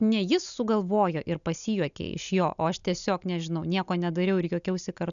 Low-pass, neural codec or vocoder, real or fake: 7.2 kHz; none; real